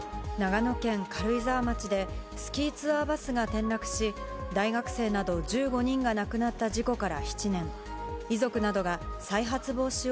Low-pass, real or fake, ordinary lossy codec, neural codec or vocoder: none; real; none; none